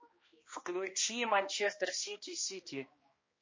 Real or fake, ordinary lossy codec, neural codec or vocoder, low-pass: fake; MP3, 32 kbps; codec, 16 kHz, 1 kbps, X-Codec, HuBERT features, trained on balanced general audio; 7.2 kHz